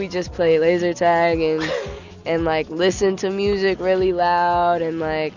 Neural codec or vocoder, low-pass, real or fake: none; 7.2 kHz; real